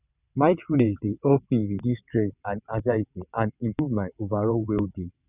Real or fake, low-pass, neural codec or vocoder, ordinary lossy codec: fake; 3.6 kHz; vocoder, 22.05 kHz, 80 mel bands, WaveNeXt; none